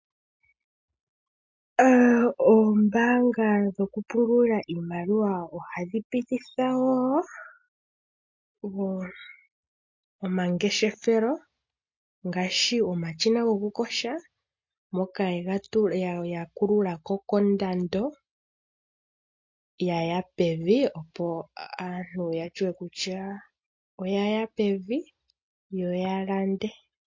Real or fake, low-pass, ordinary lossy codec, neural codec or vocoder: real; 7.2 kHz; MP3, 48 kbps; none